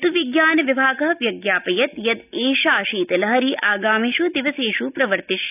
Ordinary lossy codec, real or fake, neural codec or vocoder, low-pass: none; real; none; 3.6 kHz